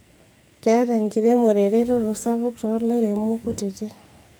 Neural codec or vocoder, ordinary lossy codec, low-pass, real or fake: codec, 44.1 kHz, 2.6 kbps, SNAC; none; none; fake